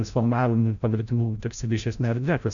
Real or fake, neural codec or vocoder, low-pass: fake; codec, 16 kHz, 0.5 kbps, FreqCodec, larger model; 7.2 kHz